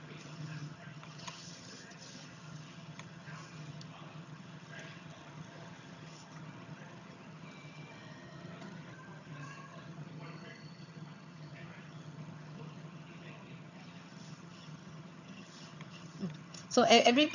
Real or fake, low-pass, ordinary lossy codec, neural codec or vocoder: fake; 7.2 kHz; none; vocoder, 22.05 kHz, 80 mel bands, HiFi-GAN